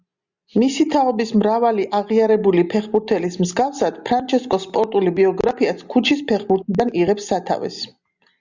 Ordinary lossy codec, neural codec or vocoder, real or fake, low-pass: Opus, 64 kbps; none; real; 7.2 kHz